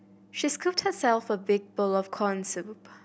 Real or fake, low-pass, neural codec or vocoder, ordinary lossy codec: real; none; none; none